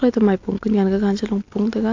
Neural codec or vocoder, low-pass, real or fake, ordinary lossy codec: none; 7.2 kHz; real; AAC, 48 kbps